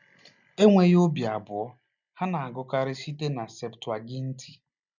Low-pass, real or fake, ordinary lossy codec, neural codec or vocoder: 7.2 kHz; real; none; none